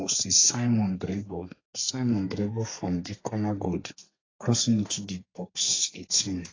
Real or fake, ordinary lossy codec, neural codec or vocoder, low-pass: fake; AAC, 32 kbps; codec, 32 kHz, 1.9 kbps, SNAC; 7.2 kHz